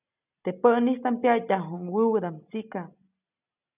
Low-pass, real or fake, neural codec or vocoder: 3.6 kHz; real; none